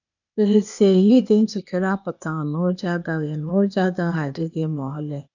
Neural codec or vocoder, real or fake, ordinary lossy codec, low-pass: codec, 16 kHz, 0.8 kbps, ZipCodec; fake; none; 7.2 kHz